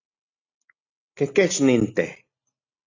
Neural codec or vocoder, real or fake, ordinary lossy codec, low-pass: none; real; AAC, 48 kbps; 7.2 kHz